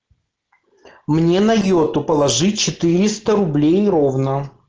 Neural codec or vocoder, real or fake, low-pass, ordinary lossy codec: none; real; 7.2 kHz; Opus, 16 kbps